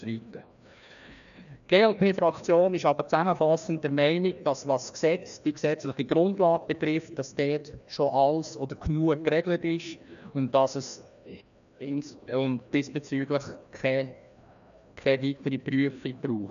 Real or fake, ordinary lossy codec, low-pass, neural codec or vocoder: fake; none; 7.2 kHz; codec, 16 kHz, 1 kbps, FreqCodec, larger model